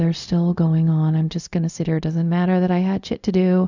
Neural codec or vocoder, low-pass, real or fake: codec, 16 kHz, 0.4 kbps, LongCat-Audio-Codec; 7.2 kHz; fake